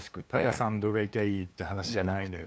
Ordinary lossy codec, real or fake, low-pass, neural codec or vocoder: none; fake; none; codec, 16 kHz, 2 kbps, FunCodec, trained on LibriTTS, 25 frames a second